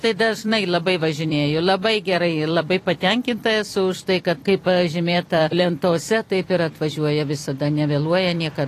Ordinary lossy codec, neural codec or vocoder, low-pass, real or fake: AAC, 48 kbps; vocoder, 44.1 kHz, 128 mel bands every 256 samples, BigVGAN v2; 14.4 kHz; fake